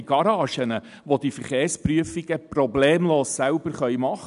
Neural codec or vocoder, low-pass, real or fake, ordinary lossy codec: none; 10.8 kHz; real; none